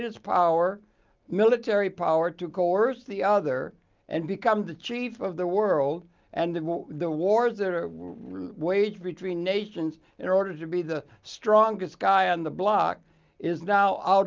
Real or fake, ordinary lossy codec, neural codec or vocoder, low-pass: real; Opus, 24 kbps; none; 7.2 kHz